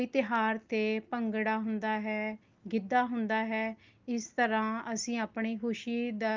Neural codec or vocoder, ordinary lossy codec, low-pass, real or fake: none; Opus, 32 kbps; 7.2 kHz; real